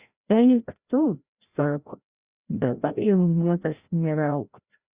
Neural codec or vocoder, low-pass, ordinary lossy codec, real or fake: codec, 16 kHz, 0.5 kbps, FreqCodec, larger model; 3.6 kHz; Opus, 64 kbps; fake